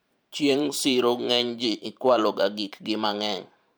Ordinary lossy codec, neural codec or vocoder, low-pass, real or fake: none; vocoder, 44.1 kHz, 128 mel bands every 512 samples, BigVGAN v2; none; fake